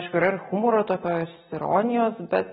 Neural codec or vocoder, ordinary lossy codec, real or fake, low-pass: none; AAC, 16 kbps; real; 19.8 kHz